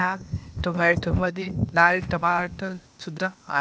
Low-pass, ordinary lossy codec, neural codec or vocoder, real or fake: none; none; codec, 16 kHz, 0.8 kbps, ZipCodec; fake